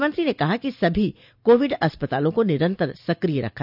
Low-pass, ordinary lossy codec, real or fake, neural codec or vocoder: 5.4 kHz; none; real; none